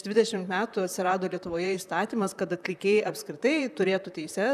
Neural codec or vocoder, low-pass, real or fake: vocoder, 44.1 kHz, 128 mel bands, Pupu-Vocoder; 14.4 kHz; fake